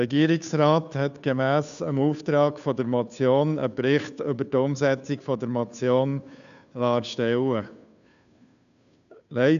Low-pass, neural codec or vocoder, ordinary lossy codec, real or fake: 7.2 kHz; codec, 16 kHz, 2 kbps, FunCodec, trained on Chinese and English, 25 frames a second; none; fake